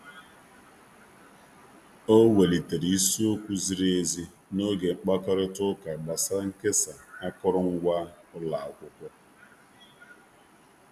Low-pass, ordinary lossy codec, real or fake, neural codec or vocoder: 14.4 kHz; none; real; none